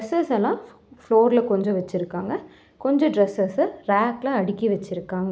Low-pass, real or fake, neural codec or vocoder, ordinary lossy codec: none; real; none; none